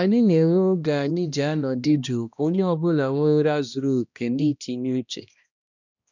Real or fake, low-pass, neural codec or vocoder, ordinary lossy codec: fake; 7.2 kHz; codec, 16 kHz, 1 kbps, X-Codec, HuBERT features, trained on balanced general audio; none